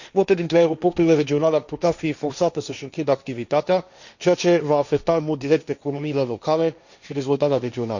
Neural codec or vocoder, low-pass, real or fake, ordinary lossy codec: codec, 16 kHz, 1.1 kbps, Voila-Tokenizer; 7.2 kHz; fake; none